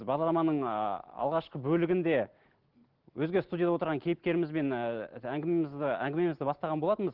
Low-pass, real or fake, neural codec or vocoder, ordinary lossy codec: 5.4 kHz; real; none; Opus, 16 kbps